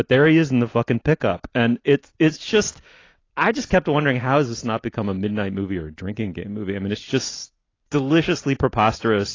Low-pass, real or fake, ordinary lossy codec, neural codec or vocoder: 7.2 kHz; real; AAC, 32 kbps; none